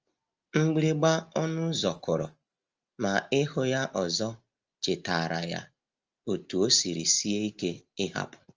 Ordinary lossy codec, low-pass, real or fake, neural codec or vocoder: Opus, 24 kbps; 7.2 kHz; real; none